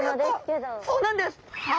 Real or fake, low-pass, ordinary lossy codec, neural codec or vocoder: real; none; none; none